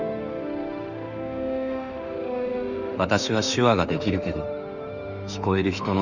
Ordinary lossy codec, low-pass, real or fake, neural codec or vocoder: none; 7.2 kHz; fake; autoencoder, 48 kHz, 32 numbers a frame, DAC-VAE, trained on Japanese speech